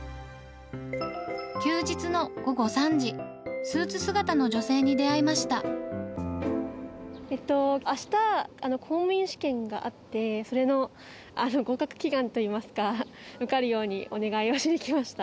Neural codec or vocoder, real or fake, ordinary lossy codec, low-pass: none; real; none; none